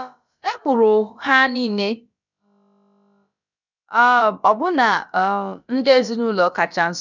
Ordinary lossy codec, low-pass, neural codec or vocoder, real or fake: none; 7.2 kHz; codec, 16 kHz, about 1 kbps, DyCAST, with the encoder's durations; fake